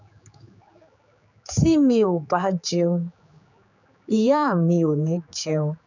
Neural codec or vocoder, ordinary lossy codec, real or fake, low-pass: codec, 16 kHz, 4 kbps, X-Codec, HuBERT features, trained on general audio; none; fake; 7.2 kHz